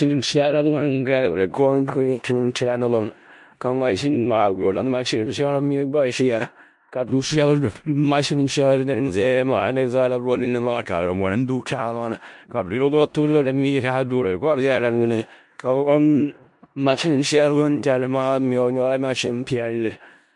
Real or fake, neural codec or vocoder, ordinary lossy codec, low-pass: fake; codec, 16 kHz in and 24 kHz out, 0.4 kbps, LongCat-Audio-Codec, four codebook decoder; MP3, 64 kbps; 10.8 kHz